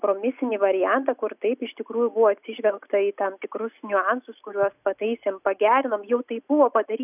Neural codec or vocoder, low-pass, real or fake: none; 3.6 kHz; real